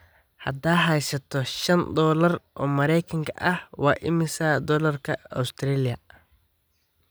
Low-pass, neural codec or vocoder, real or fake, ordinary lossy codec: none; none; real; none